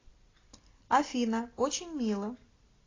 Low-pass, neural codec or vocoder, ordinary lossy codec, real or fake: 7.2 kHz; none; AAC, 32 kbps; real